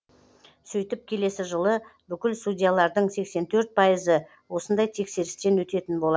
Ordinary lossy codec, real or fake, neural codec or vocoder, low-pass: none; real; none; none